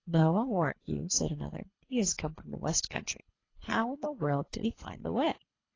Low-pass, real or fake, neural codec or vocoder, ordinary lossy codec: 7.2 kHz; fake; codec, 24 kHz, 3 kbps, HILCodec; AAC, 32 kbps